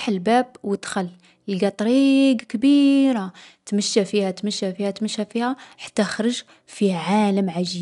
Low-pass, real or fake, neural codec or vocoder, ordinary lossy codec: 10.8 kHz; real; none; none